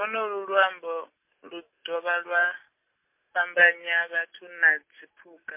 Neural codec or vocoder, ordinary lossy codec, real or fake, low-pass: none; MP3, 24 kbps; real; 3.6 kHz